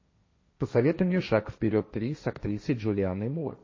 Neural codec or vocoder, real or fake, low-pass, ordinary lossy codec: codec, 16 kHz, 1.1 kbps, Voila-Tokenizer; fake; 7.2 kHz; MP3, 32 kbps